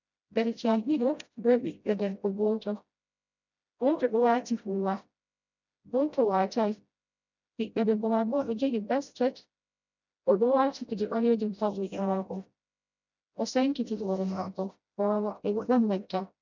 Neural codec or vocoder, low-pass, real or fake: codec, 16 kHz, 0.5 kbps, FreqCodec, smaller model; 7.2 kHz; fake